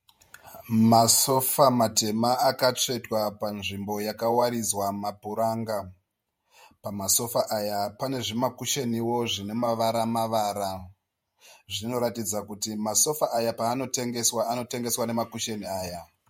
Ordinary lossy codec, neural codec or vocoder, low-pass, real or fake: MP3, 64 kbps; vocoder, 48 kHz, 128 mel bands, Vocos; 19.8 kHz; fake